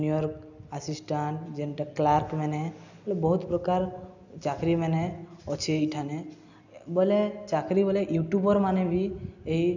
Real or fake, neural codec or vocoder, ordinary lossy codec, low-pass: real; none; none; 7.2 kHz